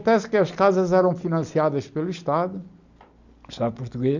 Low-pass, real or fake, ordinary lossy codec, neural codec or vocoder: 7.2 kHz; real; none; none